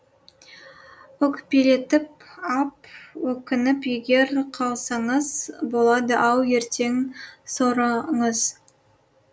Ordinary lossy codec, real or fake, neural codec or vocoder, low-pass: none; real; none; none